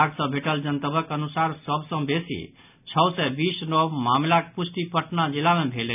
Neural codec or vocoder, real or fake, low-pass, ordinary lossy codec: none; real; 3.6 kHz; none